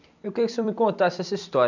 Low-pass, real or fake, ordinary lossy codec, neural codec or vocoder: 7.2 kHz; fake; none; vocoder, 44.1 kHz, 128 mel bands every 256 samples, BigVGAN v2